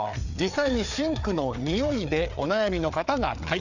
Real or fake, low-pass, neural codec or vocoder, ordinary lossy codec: fake; 7.2 kHz; codec, 16 kHz, 4 kbps, FreqCodec, larger model; none